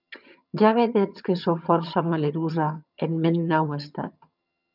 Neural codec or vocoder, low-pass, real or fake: vocoder, 22.05 kHz, 80 mel bands, HiFi-GAN; 5.4 kHz; fake